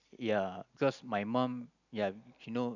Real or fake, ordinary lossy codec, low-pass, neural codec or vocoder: real; none; 7.2 kHz; none